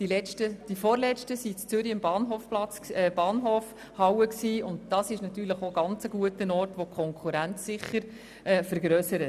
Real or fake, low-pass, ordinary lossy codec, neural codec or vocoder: real; 14.4 kHz; none; none